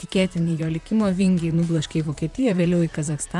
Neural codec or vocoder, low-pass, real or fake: vocoder, 44.1 kHz, 128 mel bands, Pupu-Vocoder; 10.8 kHz; fake